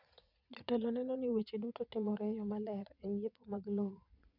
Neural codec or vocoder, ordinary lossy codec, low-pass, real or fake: vocoder, 22.05 kHz, 80 mel bands, Vocos; none; 5.4 kHz; fake